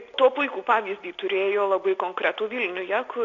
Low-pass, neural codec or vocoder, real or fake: 7.2 kHz; none; real